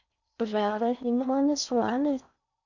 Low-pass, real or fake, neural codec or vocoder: 7.2 kHz; fake; codec, 16 kHz in and 24 kHz out, 0.6 kbps, FocalCodec, streaming, 4096 codes